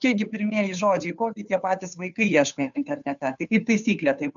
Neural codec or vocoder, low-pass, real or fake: codec, 16 kHz, 2 kbps, FunCodec, trained on Chinese and English, 25 frames a second; 7.2 kHz; fake